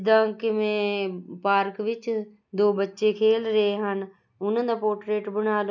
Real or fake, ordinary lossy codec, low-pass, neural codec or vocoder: real; none; 7.2 kHz; none